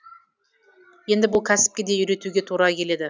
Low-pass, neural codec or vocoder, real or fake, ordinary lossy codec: none; none; real; none